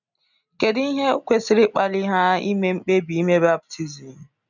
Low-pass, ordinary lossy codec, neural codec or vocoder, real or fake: 7.2 kHz; none; none; real